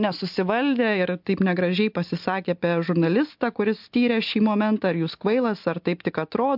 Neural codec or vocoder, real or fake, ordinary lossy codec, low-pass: none; real; MP3, 48 kbps; 5.4 kHz